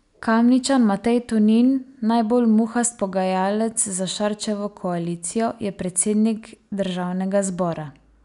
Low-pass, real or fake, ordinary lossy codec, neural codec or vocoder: 10.8 kHz; real; none; none